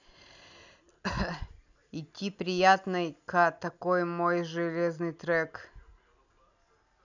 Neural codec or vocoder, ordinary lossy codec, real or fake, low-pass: none; none; real; 7.2 kHz